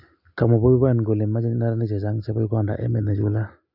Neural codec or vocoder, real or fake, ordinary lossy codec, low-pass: none; real; none; 5.4 kHz